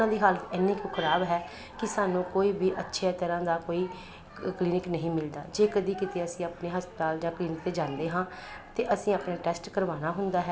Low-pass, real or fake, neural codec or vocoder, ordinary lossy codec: none; real; none; none